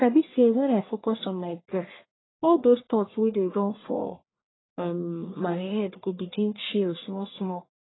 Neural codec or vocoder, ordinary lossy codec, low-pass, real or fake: codec, 44.1 kHz, 1.7 kbps, Pupu-Codec; AAC, 16 kbps; 7.2 kHz; fake